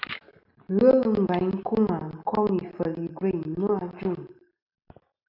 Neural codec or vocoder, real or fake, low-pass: none; real; 5.4 kHz